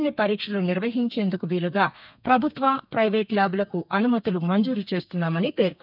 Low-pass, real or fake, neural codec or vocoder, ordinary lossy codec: 5.4 kHz; fake; codec, 32 kHz, 1.9 kbps, SNAC; none